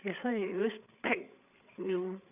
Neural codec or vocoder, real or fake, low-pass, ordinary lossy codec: codec, 16 kHz, 8 kbps, FreqCodec, larger model; fake; 3.6 kHz; none